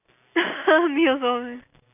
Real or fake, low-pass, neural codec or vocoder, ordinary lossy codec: real; 3.6 kHz; none; none